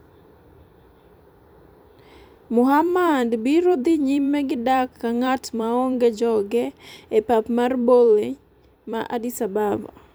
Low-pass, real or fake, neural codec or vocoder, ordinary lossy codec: none; fake; vocoder, 44.1 kHz, 128 mel bands every 256 samples, BigVGAN v2; none